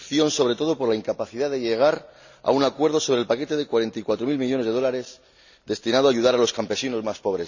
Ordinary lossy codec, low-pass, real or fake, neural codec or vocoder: none; 7.2 kHz; real; none